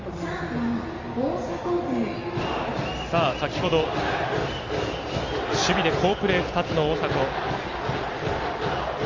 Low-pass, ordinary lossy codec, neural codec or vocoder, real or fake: 7.2 kHz; Opus, 32 kbps; none; real